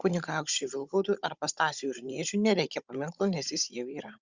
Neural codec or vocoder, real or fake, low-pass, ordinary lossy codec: codec, 16 kHz, 16 kbps, FunCodec, trained on Chinese and English, 50 frames a second; fake; 7.2 kHz; Opus, 64 kbps